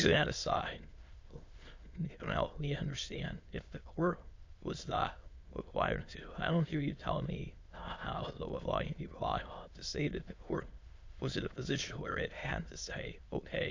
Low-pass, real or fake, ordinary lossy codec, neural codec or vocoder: 7.2 kHz; fake; MP3, 48 kbps; autoencoder, 22.05 kHz, a latent of 192 numbers a frame, VITS, trained on many speakers